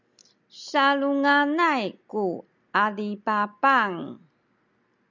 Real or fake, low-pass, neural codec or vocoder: real; 7.2 kHz; none